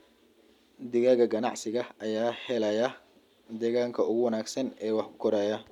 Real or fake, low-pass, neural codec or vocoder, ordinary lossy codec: real; 19.8 kHz; none; none